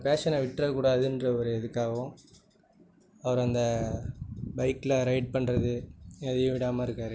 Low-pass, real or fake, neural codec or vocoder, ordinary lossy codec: none; real; none; none